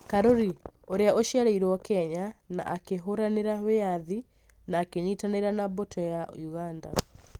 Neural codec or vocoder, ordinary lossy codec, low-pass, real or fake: none; Opus, 24 kbps; 19.8 kHz; real